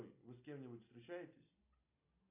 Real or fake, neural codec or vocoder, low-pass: real; none; 3.6 kHz